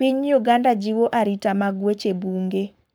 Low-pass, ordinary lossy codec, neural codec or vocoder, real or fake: none; none; codec, 44.1 kHz, 7.8 kbps, Pupu-Codec; fake